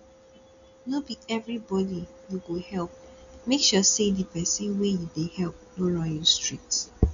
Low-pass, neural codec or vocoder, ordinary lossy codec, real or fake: 7.2 kHz; none; none; real